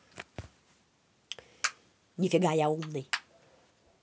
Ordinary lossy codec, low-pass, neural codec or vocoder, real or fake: none; none; none; real